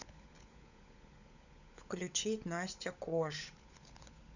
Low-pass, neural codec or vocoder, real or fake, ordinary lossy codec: 7.2 kHz; codec, 16 kHz, 16 kbps, FunCodec, trained on LibriTTS, 50 frames a second; fake; none